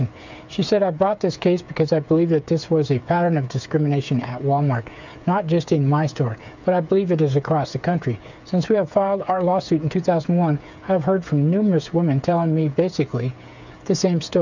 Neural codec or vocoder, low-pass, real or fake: codec, 16 kHz, 8 kbps, FreqCodec, smaller model; 7.2 kHz; fake